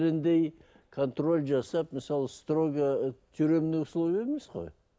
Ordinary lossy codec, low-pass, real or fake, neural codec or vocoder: none; none; real; none